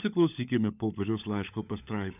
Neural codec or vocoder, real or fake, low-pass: codec, 16 kHz, 16 kbps, FunCodec, trained on LibriTTS, 50 frames a second; fake; 3.6 kHz